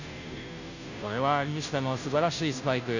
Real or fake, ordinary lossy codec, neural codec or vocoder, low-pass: fake; none; codec, 16 kHz, 0.5 kbps, FunCodec, trained on Chinese and English, 25 frames a second; 7.2 kHz